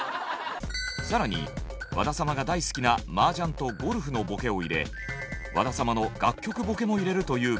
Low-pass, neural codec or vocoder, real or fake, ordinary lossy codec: none; none; real; none